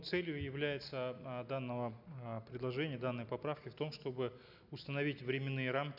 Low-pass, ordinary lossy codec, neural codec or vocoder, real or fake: 5.4 kHz; none; none; real